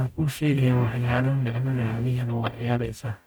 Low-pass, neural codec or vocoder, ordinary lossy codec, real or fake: none; codec, 44.1 kHz, 0.9 kbps, DAC; none; fake